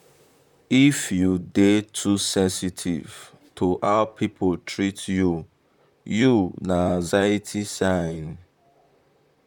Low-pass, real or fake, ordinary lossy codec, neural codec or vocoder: 19.8 kHz; fake; none; vocoder, 44.1 kHz, 128 mel bands, Pupu-Vocoder